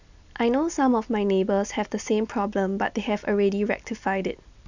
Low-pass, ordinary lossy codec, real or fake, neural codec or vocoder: 7.2 kHz; none; real; none